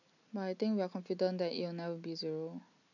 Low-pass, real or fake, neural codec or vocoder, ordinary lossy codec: 7.2 kHz; real; none; none